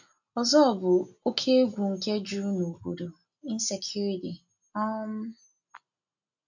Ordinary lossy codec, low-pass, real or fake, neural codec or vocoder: none; 7.2 kHz; real; none